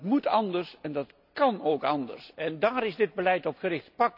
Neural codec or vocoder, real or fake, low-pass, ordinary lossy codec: none; real; 5.4 kHz; none